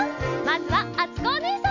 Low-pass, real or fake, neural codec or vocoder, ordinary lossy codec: 7.2 kHz; real; none; none